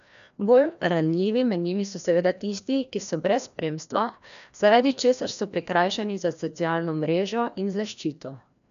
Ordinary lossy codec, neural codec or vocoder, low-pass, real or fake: none; codec, 16 kHz, 1 kbps, FreqCodec, larger model; 7.2 kHz; fake